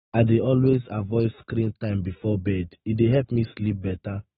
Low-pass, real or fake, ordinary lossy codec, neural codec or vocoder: 19.8 kHz; fake; AAC, 16 kbps; autoencoder, 48 kHz, 128 numbers a frame, DAC-VAE, trained on Japanese speech